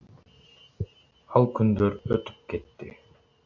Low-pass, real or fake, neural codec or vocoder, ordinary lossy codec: 7.2 kHz; real; none; AAC, 48 kbps